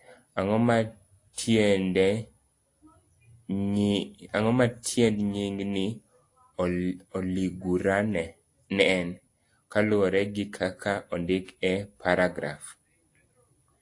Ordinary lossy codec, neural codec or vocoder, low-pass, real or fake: AAC, 48 kbps; none; 10.8 kHz; real